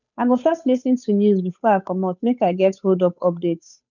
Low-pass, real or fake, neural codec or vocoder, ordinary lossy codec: 7.2 kHz; fake; codec, 16 kHz, 8 kbps, FunCodec, trained on Chinese and English, 25 frames a second; none